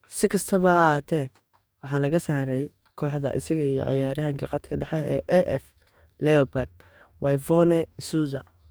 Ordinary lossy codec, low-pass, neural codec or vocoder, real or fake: none; none; codec, 44.1 kHz, 2.6 kbps, DAC; fake